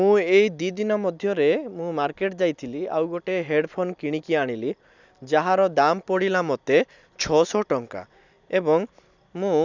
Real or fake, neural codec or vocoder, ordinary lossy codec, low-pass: real; none; none; 7.2 kHz